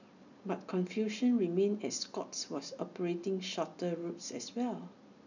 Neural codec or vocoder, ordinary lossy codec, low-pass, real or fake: none; none; 7.2 kHz; real